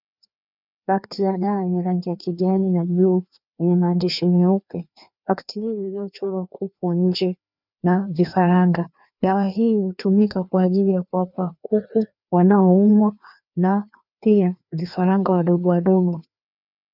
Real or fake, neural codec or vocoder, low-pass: fake; codec, 16 kHz, 2 kbps, FreqCodec, larger model; 5.4 kHz